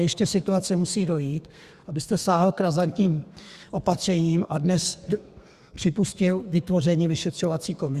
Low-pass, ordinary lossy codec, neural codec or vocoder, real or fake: 14.4 kHz; Opus, 64 kbps; codec, 32 kHz, 1.9 kbps, SNAC; fake